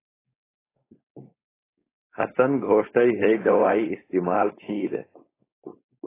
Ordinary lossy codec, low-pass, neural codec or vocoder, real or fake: AAC, 16 kbps; 3.6 kHz; codec, 16 kHz, 4.8 kbps, FACodec; fake